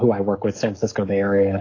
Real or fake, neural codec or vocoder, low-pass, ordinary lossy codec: fake; codec, 44.1 kHz, 7.8 kbps, DAC; 7.2 kHz; AAC, 32 kbps